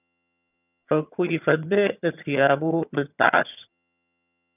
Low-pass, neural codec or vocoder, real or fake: 3.6 kHz; vocoder, 22.05 kHz, 80 mel bands, HiFi-GAN; fake